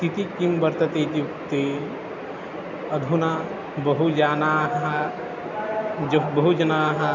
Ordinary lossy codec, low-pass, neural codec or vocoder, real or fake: none; 7.2 kHz; none; real